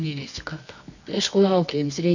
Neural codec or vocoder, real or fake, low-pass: codec, 24 kHz, 0.9 kbps, WavTokenizer, medium music audio release; fake; 7.2 kHz